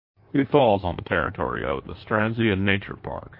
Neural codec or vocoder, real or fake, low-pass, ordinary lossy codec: codec, 16 kHz in and 24 kHz out, 1.1 kbps, FireRedTTS-2 codec; fake; 5.4 kHz; MP3, 32 kbps